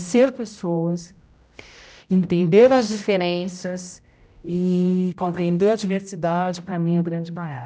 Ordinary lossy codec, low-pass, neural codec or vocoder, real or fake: none; none; codec, 16 kHz, 0.5 kbps, X-Codec, HuBERT features, trained on general audio; fake